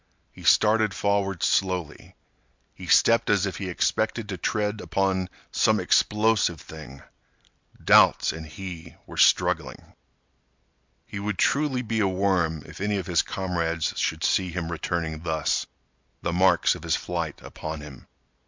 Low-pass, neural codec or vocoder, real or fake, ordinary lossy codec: 7.2 kHz; none; real; MP3, 64 kbps